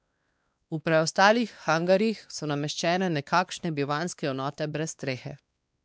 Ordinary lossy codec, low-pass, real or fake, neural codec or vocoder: none; none; fake; codec, 16 kHz, 2 kbps, X-Codec, WavLM features, trained on Multilingual LibriSpeech